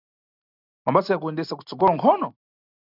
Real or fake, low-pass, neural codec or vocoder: real; 5.4 kHz; none